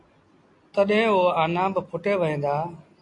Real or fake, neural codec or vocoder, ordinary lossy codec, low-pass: real; none; AAC, 48 kbps; 10.8 kHz